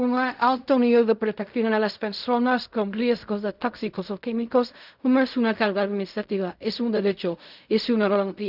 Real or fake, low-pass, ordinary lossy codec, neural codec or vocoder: fake; 5.4 kHz; none; codec, 16 kHz in and 24 kHz out, 0.4 kbps, LongCat-Audio-Codec, fine tuned four codebook decoder